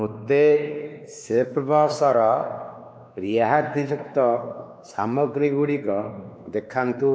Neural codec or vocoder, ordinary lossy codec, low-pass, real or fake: codec, 16 kHz, 2 kbps, X-Codec, WavLM features, trained on Multilingual LibriSpeech; none; none; fake